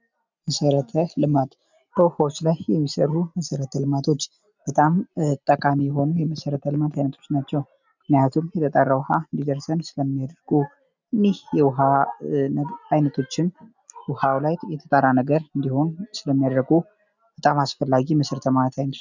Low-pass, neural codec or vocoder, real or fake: 7.2 kHz; none; real